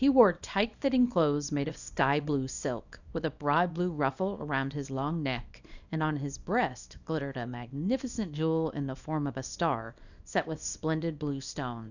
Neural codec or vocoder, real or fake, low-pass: codec, 24 kHz, 0.9 kbps, WavTokenizer, small release; fake; 7.2 kHz